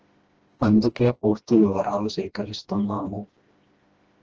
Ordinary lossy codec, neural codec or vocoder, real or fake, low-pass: Opus, 16 kbps; codec, 16 kHz, 1 kbps, FreqCodec, smaller model; fake; 7.2 kHz